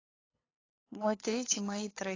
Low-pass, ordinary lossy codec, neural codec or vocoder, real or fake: 7.2 kHz; AAC, 32 kbps; codec, 16 kHz, 8 kbps, FunCodec, trained on LibriTTS, 25 frames a second; fake